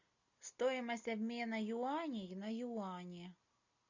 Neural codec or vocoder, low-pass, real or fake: none; 7.2 kHz; real